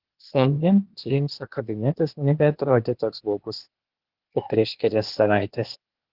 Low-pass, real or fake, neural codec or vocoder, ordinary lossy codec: 5.4 kHz; fake; codec, 16 kHz, 0.8 kbps, ZipCodec; Opus, 16 kbps